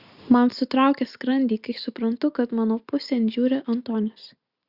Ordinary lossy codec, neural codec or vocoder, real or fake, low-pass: Opus, 64 kbps; none; real; 5.4 kHz